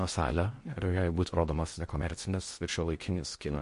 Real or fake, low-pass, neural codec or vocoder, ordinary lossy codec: fake; 10.8 kHz; codec, 16 kHz in and 24 kHz out, 0.6 kbps, FocalCodec, streaming, 2048 codes; MP3, 48 kbps